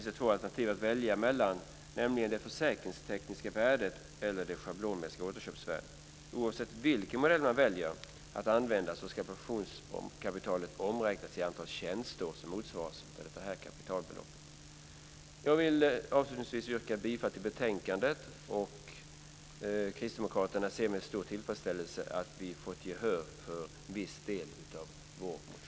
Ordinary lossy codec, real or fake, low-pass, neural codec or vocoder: none; real; none; none